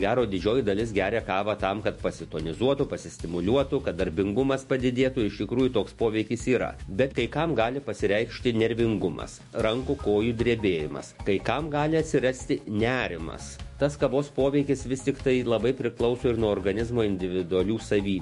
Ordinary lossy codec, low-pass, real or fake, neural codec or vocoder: MP3, 48 kbps; 14.4 kHz; fake; autoencoder, 48 kHz, 128 numbers a frame, DAC-VAE, trained on Japanese speech